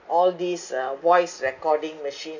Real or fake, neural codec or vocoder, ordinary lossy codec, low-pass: real; none; none; 7.2 kHz